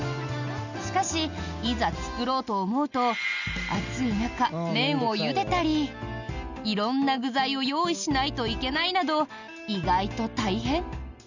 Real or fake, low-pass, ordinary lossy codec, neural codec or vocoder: real; 7.2 kHz; none; none